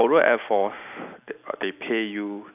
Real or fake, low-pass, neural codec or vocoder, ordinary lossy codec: real; 3.6 kHz; none; none